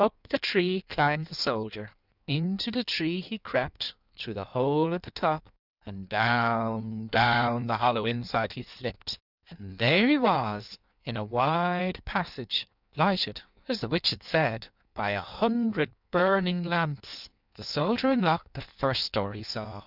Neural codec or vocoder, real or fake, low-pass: codec, 16 kHz in and 24 kHz out, 1.1 kbps, FireRedTTS-2 codec; fake; 5.4 kHz